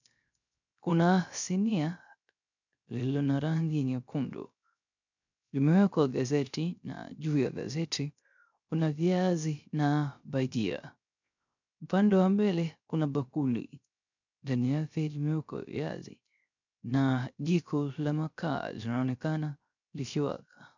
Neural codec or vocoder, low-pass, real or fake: codec, 16 kHz, 0.3 kbps, FocalCodec; 7.2 kHz; fake